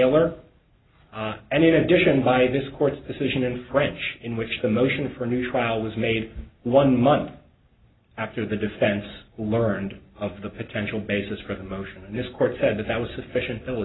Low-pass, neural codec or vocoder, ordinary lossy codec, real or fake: 7.2 kHz; none; AAC, 16 kbps; real